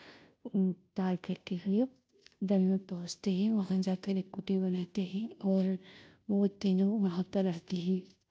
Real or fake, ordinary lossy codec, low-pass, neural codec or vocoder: fake; none; none; codec, 16 kHz, 0.5 kbps, FunCodec, trained on Chinese and English, 25 frames a second